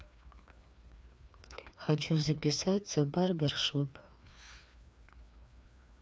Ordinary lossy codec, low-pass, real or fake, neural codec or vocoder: none; none; fake; codec, 16 kHz, 2 kbps, FreqCodec, larger model